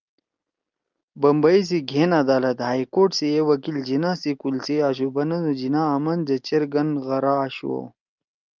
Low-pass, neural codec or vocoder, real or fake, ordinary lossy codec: 7.2 kHz; none; real; Opus, 24 kbps